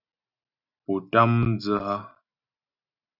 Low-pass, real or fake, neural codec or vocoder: 5.4 kHz; real; none